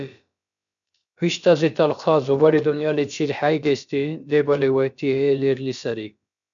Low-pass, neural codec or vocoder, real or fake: 7.2 kHz; codec, 16 kHz, about 1 kbps, DyCAST, with the encoder's durations; fake